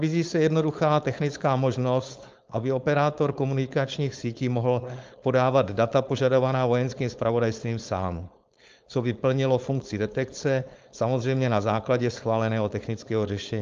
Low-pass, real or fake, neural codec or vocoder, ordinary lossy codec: 7.2 kHz; fake; codec, 16 kHz, 4.8 kbps, FACodec; Opus, 24 kbps